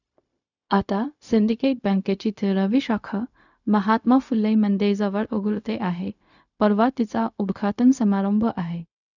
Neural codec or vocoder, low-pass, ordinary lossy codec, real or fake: codec, 16 kHz, 0.4 kbps, LongCat-Audio-Codec; 7.2 kHz; none; fake